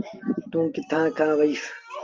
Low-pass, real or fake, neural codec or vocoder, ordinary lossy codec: 7.2 kHz; real; none; Opus, 32 kbps